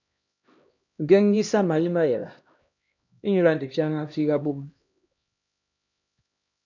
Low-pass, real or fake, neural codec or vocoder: 7.2 kHz; fake; codec, 16 kHz, 1 kbps, X-Codec, HuBERT features, trained on LibriSpeech